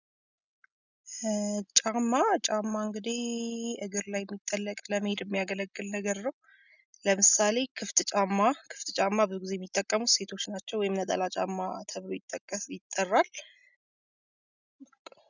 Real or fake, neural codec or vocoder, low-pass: real; none; 7.2 kHz